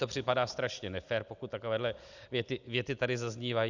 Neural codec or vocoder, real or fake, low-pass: none; real; 7.2 kHz